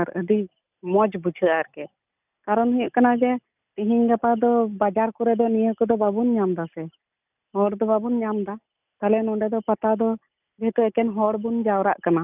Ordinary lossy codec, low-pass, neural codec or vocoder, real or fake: none; 3.6 kHz; none; real